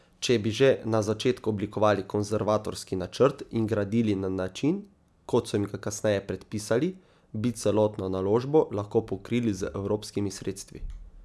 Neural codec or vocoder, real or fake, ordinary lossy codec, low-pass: none; real; none; none